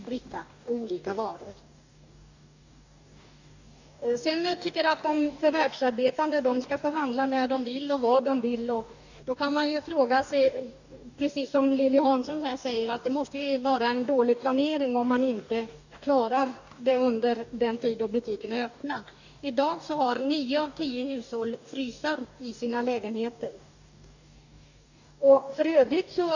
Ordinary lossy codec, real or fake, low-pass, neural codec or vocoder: none; fake; 7.2 kHz; codec, 44.1 kHz, 2.6 kbps, DAC